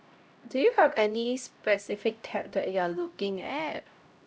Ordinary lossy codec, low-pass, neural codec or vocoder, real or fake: none; none; codec, 16 kHz, 0.5 kbps, X-Codec, HuBERT features, trained on LibriSpeech; fake